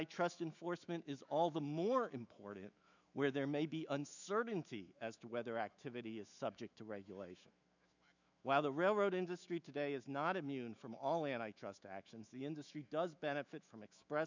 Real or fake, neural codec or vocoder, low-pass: fake; autoencoder, 48 kHz, 128 numbers a frame, DAC-VAE, trained on Japanese speech; 7.2 kHz